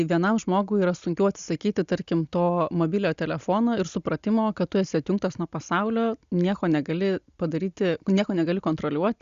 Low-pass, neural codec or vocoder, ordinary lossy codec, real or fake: 7.2 kHz; none; Opus, 64 kbps; real